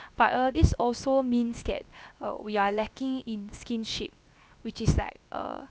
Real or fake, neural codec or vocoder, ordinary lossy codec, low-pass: fake; codec, 16 kHz, 0.7 kbps, FocalCodec; none; none